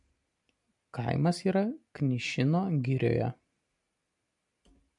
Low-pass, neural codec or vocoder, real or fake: 10.8 kHz; none; real